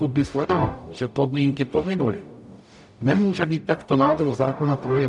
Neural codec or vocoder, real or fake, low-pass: codec, 44.1 kHz, 0.9 kbps, DAC; fake; 10.8 kHz